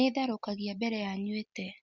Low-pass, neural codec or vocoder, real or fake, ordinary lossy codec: 7.2 kHz; none; real; Opus, 64 kbps